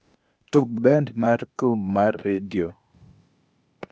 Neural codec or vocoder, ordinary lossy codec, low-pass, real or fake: codec, 16 kHz, 0.8 kbps, ZipCodec; none; none; fake